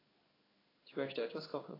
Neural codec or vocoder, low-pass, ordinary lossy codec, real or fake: codec, 16 kHz in and 24 kHz out, 1 kbps, XY-Tokenizer; 5.4 kHz; AAC, 24 kbps; fake